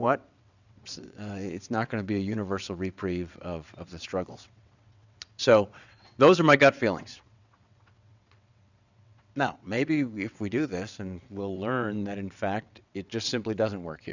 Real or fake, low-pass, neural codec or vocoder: fake; 7.2 kHz; vocoder, 22.05 kHz, 80 mel bands, WaveNeXt